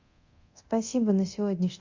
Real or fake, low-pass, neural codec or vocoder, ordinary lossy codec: fake; 7.2 kHz; codec, 24 kHz, 0.9 kbps, DualCodec; Opus, 64 kbps